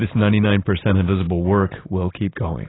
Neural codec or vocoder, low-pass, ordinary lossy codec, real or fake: none; 7.2 kHz; AAC, 16 kbps; real